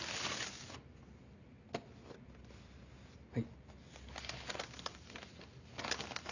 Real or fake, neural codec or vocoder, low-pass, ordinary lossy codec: real; none; 7.2 kHz; none